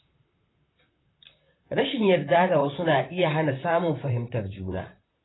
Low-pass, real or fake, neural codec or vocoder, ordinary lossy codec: 7.2 kHz; fake; vocoder, 44.1 kHz, 128 mel bands, Pupu-Vocoder; AAC, 16 kbps